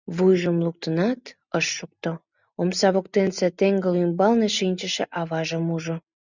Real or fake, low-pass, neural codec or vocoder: real; 7.2 kHz; none